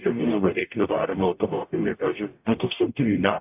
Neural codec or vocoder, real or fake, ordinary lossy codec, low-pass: codec, 44.1 kHz, 0.9 kbps, DAC; fake; AAC, 32 kbps; 3.6 kHz